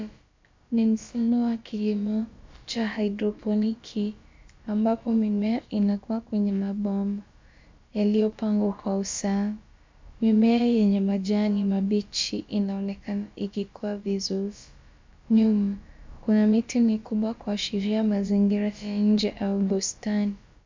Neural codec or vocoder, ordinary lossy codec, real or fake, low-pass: codec, 16 kHz, about 1 kbps, DyCAST, with the encoder's durations; MP3, 64 kbps; fake; 7.2 kHz